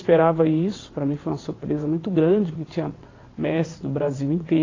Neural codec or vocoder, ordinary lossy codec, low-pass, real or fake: vocoder, 22.05 kHz, 80 mel bands, WaveNeXt; AAC, 32 kbps; 7.2 kHz; fake